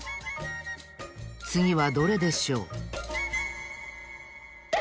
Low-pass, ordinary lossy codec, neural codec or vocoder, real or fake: none; none; none; real